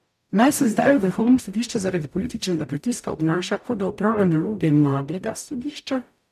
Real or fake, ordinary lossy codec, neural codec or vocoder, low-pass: fake; none; codec, 44.1 kHz, 0.9 kbps, DAC; 14.4 kHz